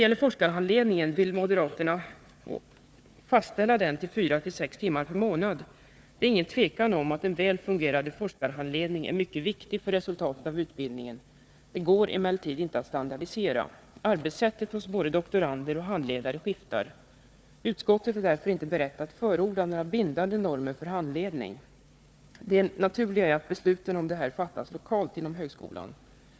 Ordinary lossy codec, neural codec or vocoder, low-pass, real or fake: none; codec, 16 kHz, 4 kbps, FunCodec, trained on Chinese and English, 50 frames a second; none; fake